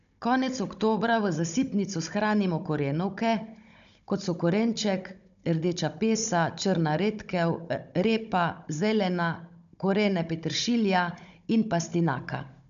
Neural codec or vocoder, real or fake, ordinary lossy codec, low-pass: codec, 16 kHz, 16 kbps, FunCodec, trained on Chinese and English, 50 frames a second; fake; none; 7.2 kHz